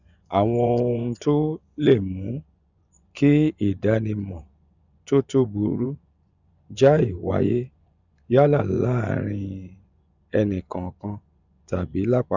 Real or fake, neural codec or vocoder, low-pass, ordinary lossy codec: fake; vocoder, 24 kHz, 100 mel bands, Vocos; 7.2 kHz; none